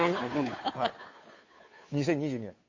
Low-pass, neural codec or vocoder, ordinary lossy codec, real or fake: 7.2 kHz; codec, 16 kHz, 2 kbps, FunCodec, trained on Chinese and English, 25 frames a second; MP3, 32 kbps; fake